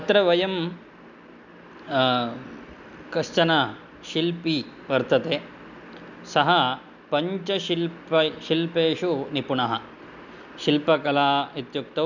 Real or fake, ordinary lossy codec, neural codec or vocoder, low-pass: real; none; none; 7.2 kHz